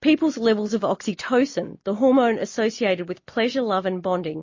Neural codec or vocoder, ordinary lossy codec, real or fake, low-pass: none; MP3, 32 kbps; real; 7.2 kHz